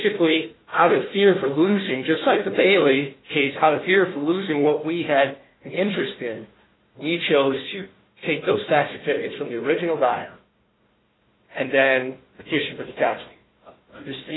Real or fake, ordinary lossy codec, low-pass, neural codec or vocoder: fake; AAC, 16 kbps; 7.2 kHz; codec, 16 kHz, 1 kbps, FunCodec, trained on Chinese and English, 50 frames a second